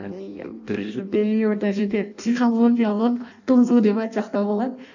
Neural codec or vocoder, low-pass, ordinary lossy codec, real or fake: codec, 16 kHz in and 24 kHz out, 0.6 kbps, FireRedTTS-2 codec; 7.2 kHz; none; fake